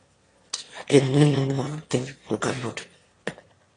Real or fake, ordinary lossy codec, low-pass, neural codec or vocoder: fake; AAC, 32 kbps; 9.9 kHz; autoencoder, 22.05 kHz, a latent of 192 numbers a frame, VITS, trained on one speaker